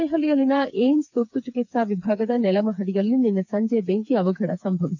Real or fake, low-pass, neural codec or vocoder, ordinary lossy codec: fake; 7.2 kHz; codec, 16 kHz, 4 kbps, FreqCodec, smaller model; none